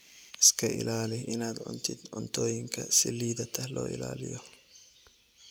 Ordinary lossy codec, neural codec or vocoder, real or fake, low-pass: none; none; real; none